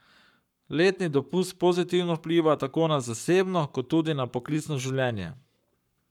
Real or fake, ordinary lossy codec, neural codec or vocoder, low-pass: fake; none; codec, 44.1 kHz, 7.8 kbps, Pupu-Codec; 19.8 kHz